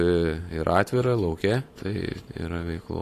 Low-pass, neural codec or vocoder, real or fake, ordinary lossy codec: 14.4 kHz; vocoder, 44.1 kHz, 128 mel bands every 512 samples, BigVGAN v2; fake; AAC, 48 kbps